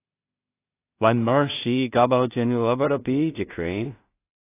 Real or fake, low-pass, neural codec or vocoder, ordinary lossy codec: fake; 3.6 kHz; codec, 16 kHz in and 24 kHz out, 0.4 kbps, LongCat-Audio-Codec, two codebook decoder; AAC, 24 kbps